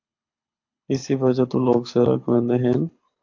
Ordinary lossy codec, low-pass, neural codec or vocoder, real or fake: AAC, 48 kbps; 7.2 kHz; codec, 24 kHz, 6 kbps, HILCodec; fake